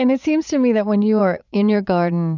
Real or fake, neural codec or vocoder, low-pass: fake; vocoder, 44.1 kHz, 80 mel bands, Vocos; 7.2 kHz